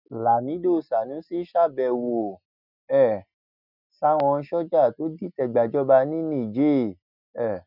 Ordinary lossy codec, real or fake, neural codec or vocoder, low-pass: none; real; none; 5.4 kHz